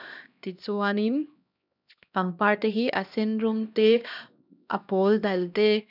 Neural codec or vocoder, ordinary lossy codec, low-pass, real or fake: codec, 16 kHz, 1 kbps, X-Codec, HuBERT features, trained on LibriSpeech; none; 5.4 kHz; fake